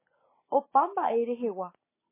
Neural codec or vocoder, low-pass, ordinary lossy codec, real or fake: none; 3.6 kHz; MP3, 16 kbps; real